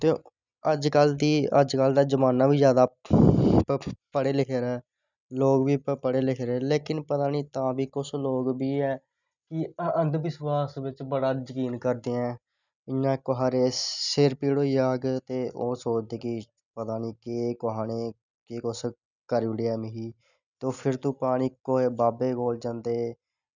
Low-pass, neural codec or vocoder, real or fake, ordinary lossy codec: 7.2 kHz; none; real; none